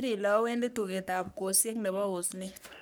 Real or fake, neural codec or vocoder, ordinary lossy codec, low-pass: fake; codec, 44.1 kHz, 3.4 kbps, Pupu-Codec; none; none